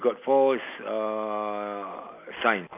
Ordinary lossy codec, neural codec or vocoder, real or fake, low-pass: AAC, 32 kbps; none; real; 3.6 kHz